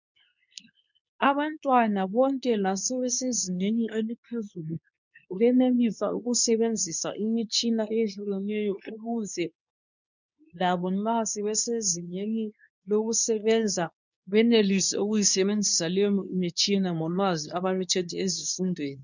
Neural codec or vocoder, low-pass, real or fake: codec, 24 kHz, 0.9 kbps, WavTokenizer, medium speech release version 2; 7.2 kHz; fake